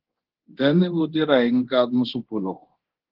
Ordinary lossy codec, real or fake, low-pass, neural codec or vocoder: Opus, 16 kbps; fake; 5.4 kHz; codec, 24 kHz, 0.9 kbps, DualCodec